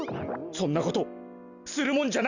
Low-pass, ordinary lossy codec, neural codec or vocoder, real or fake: 7.2 kHz; none; none; real